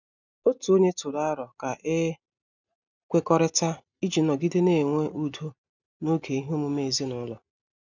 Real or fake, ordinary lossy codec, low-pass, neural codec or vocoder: real; none; 7.2 kHz; none